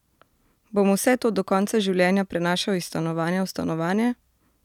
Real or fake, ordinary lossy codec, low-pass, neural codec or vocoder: real; none; 19.8 kHz; none